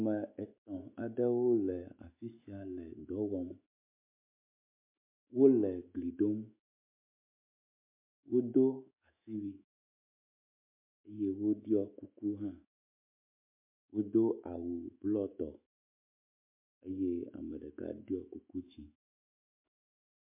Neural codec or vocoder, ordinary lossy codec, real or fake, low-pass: autoencoder, 48 kHz, 128 numbers a frame, DAC-VAE, trained on Japanese speech; MP3, 24 kbps; fake; 3.6 kHz